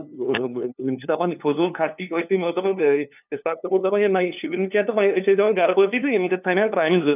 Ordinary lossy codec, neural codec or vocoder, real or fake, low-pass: none; codec, 16 kHz, 2 kbps, FunCodec, trained on LibriTTS, 25 frames a second; fake; 3.6 kHz